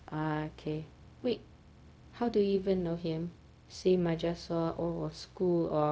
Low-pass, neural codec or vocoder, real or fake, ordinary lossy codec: none; codec, 16 kHz, 0.4 kbps, LongCat-Audio-Codec; fake; none